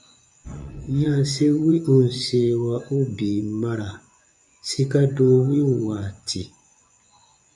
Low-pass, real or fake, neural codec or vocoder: 10.8 kHz; fake; vocoder, 24 kHz, 100 mel bands, Vocos